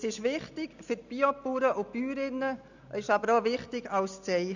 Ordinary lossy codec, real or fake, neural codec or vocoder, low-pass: none; real; none; 7.2 kHz